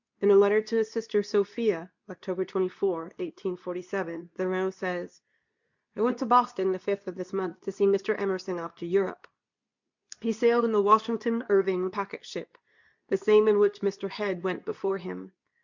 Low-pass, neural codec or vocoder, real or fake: 7.2 kHz; codec, 24 kHz, 0.9 kbps, WavTokenizer, medium speech release version 2; fake